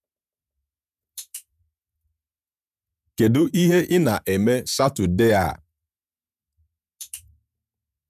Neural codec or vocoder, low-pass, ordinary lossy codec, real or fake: vocoder, 48 kHz, 128 mel bands, Vocos; 14.4 kHz; none; fake